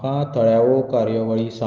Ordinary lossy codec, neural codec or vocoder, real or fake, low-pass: Opus, 32 kbps; none; real; 7.2 kHz